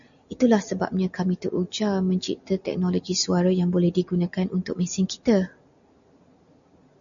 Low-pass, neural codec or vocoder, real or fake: 7.2 kHz; none; real